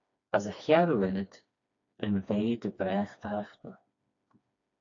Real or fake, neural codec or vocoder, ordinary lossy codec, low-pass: fake; codec, 16 kHz, 2 kbps, FreqCodec, smaller model; AAC, 48 kbps; 7.2 kHz